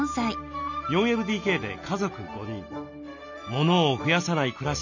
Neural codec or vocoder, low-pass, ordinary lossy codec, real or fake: none; 7.2 kHz; none; real